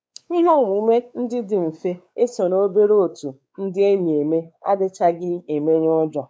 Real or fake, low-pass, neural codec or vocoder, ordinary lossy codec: fake; none; codec, 16 kHz, 4 kbps, X-Codec, WavLM features, trained on Multilingual LibriSpeech; none